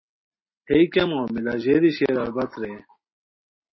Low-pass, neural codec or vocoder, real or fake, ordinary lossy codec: 7.2 kHz; none; real; MP3, 24 kbps